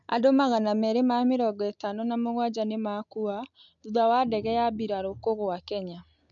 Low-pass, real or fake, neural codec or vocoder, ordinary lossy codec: 7.2 kHz; real; none; MP3, 64 kbps